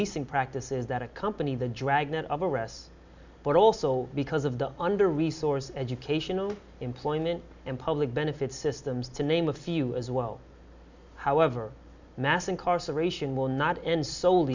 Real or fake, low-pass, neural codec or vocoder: real; 7.2 kHz; none